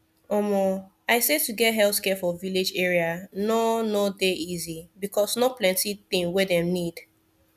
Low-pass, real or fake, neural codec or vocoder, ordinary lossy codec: 14.4 kHz; real; none; none